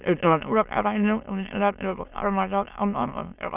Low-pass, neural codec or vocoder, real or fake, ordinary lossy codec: 3.6 kHz; autoencoder, 22.05 kHz, a latent of 192 numbers a frame, VITS, trained on many speakers; fake; none